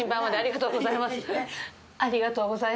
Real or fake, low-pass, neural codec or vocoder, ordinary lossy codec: real; none; none; none